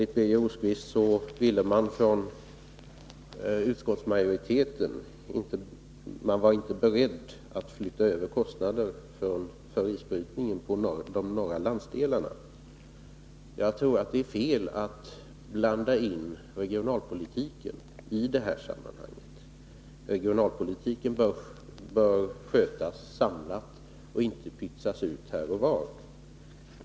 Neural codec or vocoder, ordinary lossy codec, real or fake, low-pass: none; none; real; none